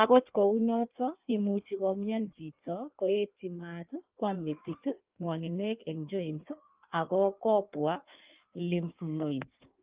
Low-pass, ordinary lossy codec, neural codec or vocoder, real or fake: 3.6 kHz; Opus, 24 kbps; codec, 16 kHz in and 24 kHz out, 1.1 kbps, FireRedTTS-2 codec; fake